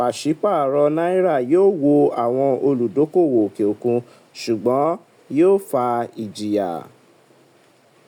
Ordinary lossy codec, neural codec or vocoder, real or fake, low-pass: none; none; real; 19.8 kHz